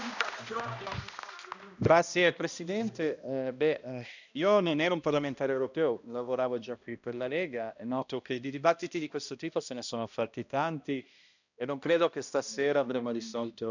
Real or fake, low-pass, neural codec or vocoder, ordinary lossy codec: fake; 7.2 kHz; codec, 16 kHz, 1 kbps, X-Codec, HuBERT features, trained on balanced general audio; none